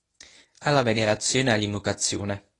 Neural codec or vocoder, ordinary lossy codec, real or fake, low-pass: codec, 24 kHz, 0.9 kbps, WavTokenizer, medium speech release version 1; AAC, 32 kbps; fake; 10.8 kHz